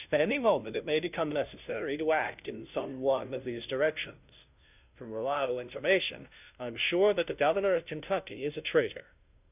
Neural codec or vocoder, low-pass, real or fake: codec, 16 kHz, 0.5 kbps, FunCodec, trained on Chinese and English, 25 frames a second; 3.6 kHz; fake